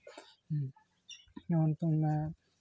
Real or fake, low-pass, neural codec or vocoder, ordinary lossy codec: real; none; none; none